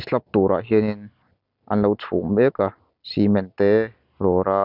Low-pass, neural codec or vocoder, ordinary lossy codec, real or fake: 5.4 kHz; none; none; real